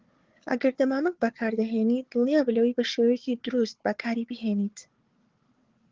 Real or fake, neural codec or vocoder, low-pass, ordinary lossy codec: fake; codec, 16 kHz, 4 kbps, FunCodec, trained on Chinese and English, 50 frames a second; 7.2 kHz; Opus, 16 kbps